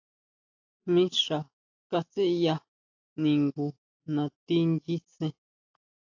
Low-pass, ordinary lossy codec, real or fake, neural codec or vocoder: 7.2 kHz; AAC, 48 kbps; real; none